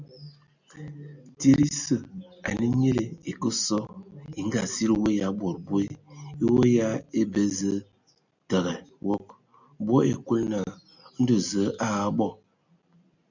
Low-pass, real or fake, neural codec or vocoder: 7.2 kHz; real; none